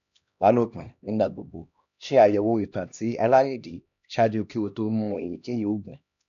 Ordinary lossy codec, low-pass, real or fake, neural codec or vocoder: none; 7.2 kHz; fake; codec, 16 kHz, 1 kbps, X-Codec, HuBERT features, trained on LibriSpeech